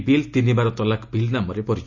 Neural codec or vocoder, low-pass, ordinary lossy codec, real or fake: none; 7.2 kHz; Opus, 64 kbps; real